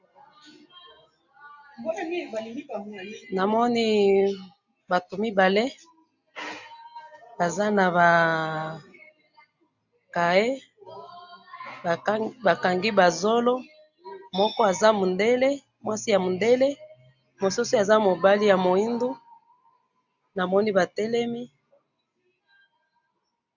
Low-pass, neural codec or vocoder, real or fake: 7.2 kHz; none; real